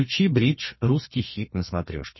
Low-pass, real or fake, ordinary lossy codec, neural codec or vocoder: 7.2 kHz; fake; MP3, 24 kbps; codec, 32 kHz, 1.9 kbps, SNAC